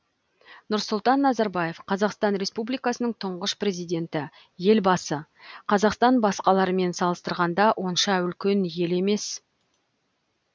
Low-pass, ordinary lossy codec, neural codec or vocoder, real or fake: none; none; none; real